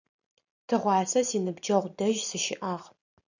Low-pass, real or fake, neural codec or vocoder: 7.2 kHz; real; none